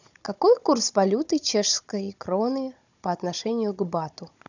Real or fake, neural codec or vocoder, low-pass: fake; codec, 16 kHz, 16 kbps, FunCodec, trained on Chinese and English, 50 frames a second; 7.2 kHz